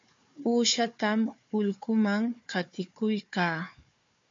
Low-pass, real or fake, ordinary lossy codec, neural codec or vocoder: 7.2 kHz; fake; MP3, 48 kbps; codec, 16 kHz, 4 kbps, FunCodec, trained on Chinese and English, 50 frames a second